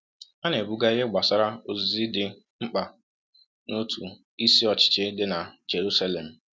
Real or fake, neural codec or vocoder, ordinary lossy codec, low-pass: real; none; none; none